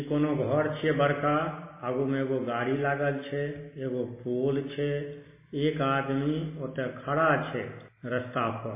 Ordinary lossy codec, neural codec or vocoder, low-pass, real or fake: MP3, 16 kbps; none; 3.6 kHz; real